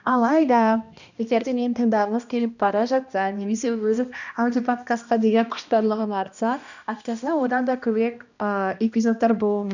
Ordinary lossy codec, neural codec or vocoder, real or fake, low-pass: none; codec, 16 kHz, 1 kbps, X-Codec, HuBERT features, trained on balanced general audio; fake; 7.2 kHz